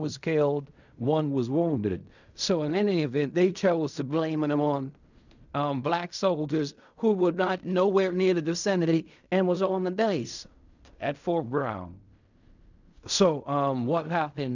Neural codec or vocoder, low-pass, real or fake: codec, 16 kHz in and 24 kHz out, 0.4 kbps, LongCat-Audio-Codec, fine tuned four codebook decoder; 7.2 kHz; fake